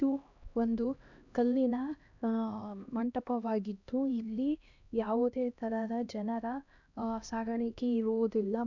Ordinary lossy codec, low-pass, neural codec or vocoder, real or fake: none; 7.2 kHz; codec, 16 kHz, 1 kbps, X-Codec, HuBERT features, trained on LibriSpeech; fake